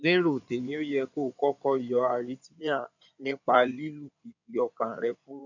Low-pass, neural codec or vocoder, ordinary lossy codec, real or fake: 7.2 kHz; codec, 16 kHz in and 24 kHz out, 2.2 kbps, FireRedTTS-2 codec; none; fake